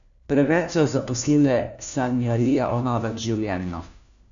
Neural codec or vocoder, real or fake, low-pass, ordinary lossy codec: codec, 16 kHz, 1 kbps, FunCodec, trained on LibriTTS, 50 frames a second; fake; 7.2 kHz; none